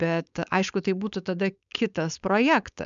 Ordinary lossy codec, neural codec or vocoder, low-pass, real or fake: MP3, 96 kbps; codec, 16 kHz, 4.8 kbps, FACodec; 7.2 kHz; fake